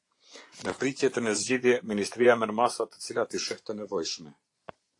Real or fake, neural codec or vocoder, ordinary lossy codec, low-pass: fake; vocoder, 24 kHz, 100 mel bands, Vocos; AAC, 48 kbps; 10.8 kHz